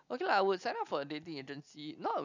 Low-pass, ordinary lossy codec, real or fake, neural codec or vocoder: 7.2 kHz; none; real; none